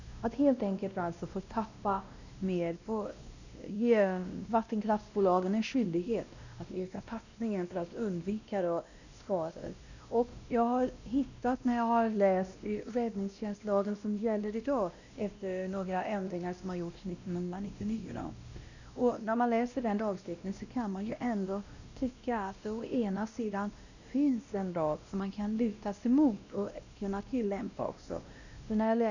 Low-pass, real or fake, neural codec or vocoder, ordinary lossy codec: 7.2 kHz; fake; codec, 16 kHz, 1 kbps, X-Codec, WavLM features, trained on Multilingual LibriSpeech; none